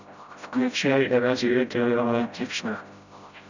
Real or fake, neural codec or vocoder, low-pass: fake; codec, 16 kHz, 0.5 kbps, FreqCodec, smaller model; 7.2 kHz